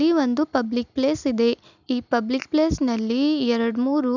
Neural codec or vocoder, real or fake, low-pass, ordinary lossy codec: none; real; 7.2 kHz; none